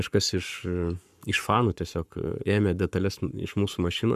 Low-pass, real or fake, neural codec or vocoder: 14.4 kHz; fake; codec, 44.1 kHz, 7.8 kbps, Pupu-Codec